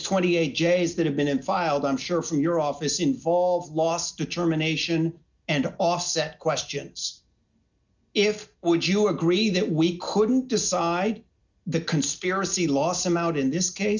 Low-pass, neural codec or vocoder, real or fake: 7.2 kHz; none; real